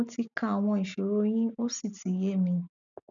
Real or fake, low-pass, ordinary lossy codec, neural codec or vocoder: real; 7.2 kHz; none; none